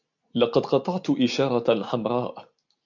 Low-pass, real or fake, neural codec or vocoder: 7.2 kHz; real; none